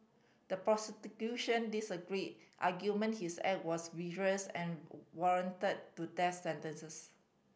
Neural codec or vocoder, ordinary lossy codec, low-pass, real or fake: none; none; none; real